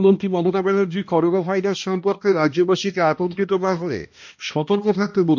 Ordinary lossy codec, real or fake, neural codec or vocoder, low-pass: MP3, 48 kbps; fake; codec, 16 kHz, 1 kbps, X-Codec, HuBERT features, trained on balanced general audio; 7.2 kHz